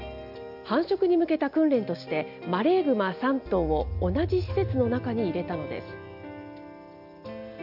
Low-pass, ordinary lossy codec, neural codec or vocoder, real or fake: 5.4 kHz; none; none; real